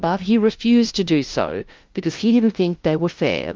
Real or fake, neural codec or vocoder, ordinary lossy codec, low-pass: fake; codec, 16 kHz, 0.5 kbps, FunCodec, trained on LibriTTS, 25 frames a second; Opus, 32 kbps; 7.2 kHz